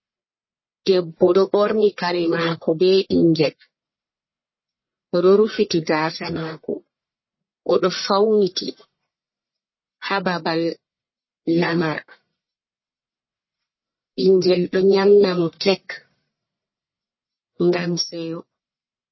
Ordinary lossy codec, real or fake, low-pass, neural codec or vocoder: MP3, 24 kbps; fake; 7.2 kHz; codec, 44.1 kHz, 1.7 kbps, Pupu-Codec